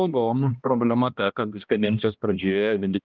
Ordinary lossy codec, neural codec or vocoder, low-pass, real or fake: Opus, 32 kbps; codec, 16 kHz, 1 kbps, X-Codec, HuBERT features, trained on balanced general audio; 7.2 kHz; fake